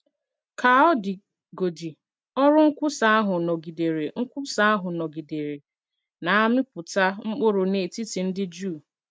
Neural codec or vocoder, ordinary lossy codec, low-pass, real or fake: none; none; none; real